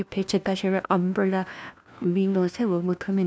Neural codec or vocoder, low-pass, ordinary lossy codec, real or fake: codec, 16 kHz, 1 kbps, FunCodec, trained on LibriTTS, 50 frames a second; none; none; fake